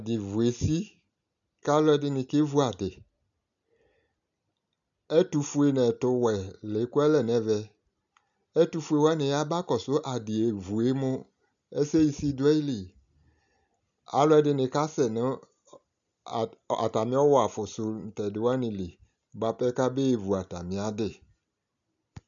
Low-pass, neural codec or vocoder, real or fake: 7.2 kHz; none; real